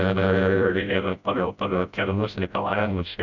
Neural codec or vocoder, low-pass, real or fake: codec, 16 kHz, 0.5 kbps, FreqCodec, smaller model; 7.2 kHz; fake